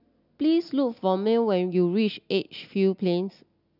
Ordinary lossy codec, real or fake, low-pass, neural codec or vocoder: none; real; 5.4 kHz; none